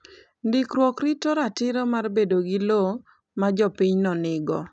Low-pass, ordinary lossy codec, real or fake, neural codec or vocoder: 9.9 kHz; none; real; none